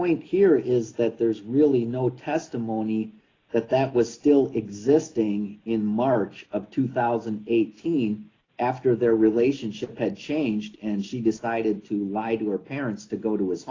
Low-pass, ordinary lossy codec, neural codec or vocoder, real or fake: 7.2 kHz; AAC, 32 kbps; none; real